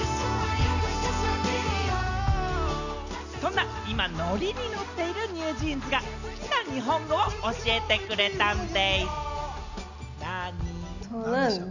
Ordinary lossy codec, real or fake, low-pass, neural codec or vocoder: none; real; 7.2 kHz; none